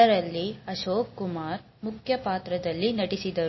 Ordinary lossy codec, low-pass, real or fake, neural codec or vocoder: MP3, 24 kbps; 7.2 kHz; real; none